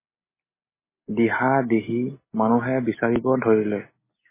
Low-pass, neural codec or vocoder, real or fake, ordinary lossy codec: 3.6 kHz; none; real; MP3, 16 kbps